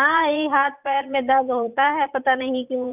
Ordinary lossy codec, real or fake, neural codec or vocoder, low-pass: none; fake; vocoder, 44.1 kHz, 80 mel bands, Vocos; 3.6 kHz